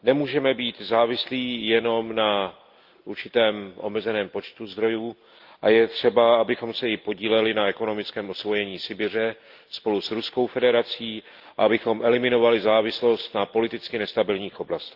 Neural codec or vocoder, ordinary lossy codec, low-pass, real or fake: none; Opus, 32 kbps; 5.4 kHz; real